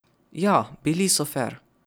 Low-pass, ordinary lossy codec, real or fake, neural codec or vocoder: none; none; real; none